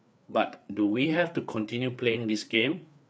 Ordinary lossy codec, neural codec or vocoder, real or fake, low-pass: none; codec, 16 kHz, 4 kbps, FreqCodec, larger model; fake; none